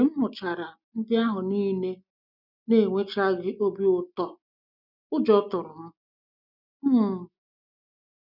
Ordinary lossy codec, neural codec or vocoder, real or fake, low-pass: none; none; real; 5.4 kHz